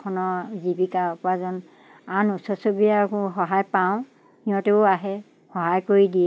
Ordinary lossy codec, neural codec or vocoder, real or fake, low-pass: none; none; real; none